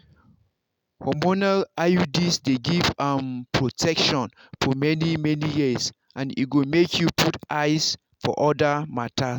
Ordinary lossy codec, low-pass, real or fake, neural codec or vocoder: none; none; real; none